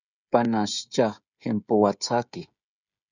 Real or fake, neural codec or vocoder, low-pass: fake; codec, 16 kHz, 16 kbps, FreqCodec, smaller model; 7.2 kHz